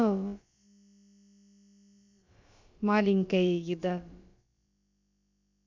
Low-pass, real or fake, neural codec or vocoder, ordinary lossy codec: 7.2 kHz; fake; codec, 16 kHz, about 1 kbps, DyCAST, with the encoder's durations; MP3, 64 kbps